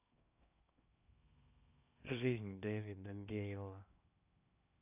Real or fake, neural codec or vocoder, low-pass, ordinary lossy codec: fake; codec, 16 kHz in and 24 kHz out, 0.6 kbps, FocalCodec, streaming, 2048 codes; 3.6 kHz; none